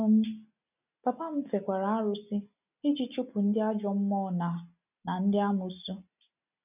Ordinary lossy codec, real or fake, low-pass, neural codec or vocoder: none; real; 3.6 kHz; none